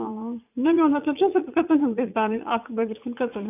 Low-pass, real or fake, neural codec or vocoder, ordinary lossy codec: 3.6 kHz; fake; vocoder, 44.1 kHz, 80 mel bands, Vocos; none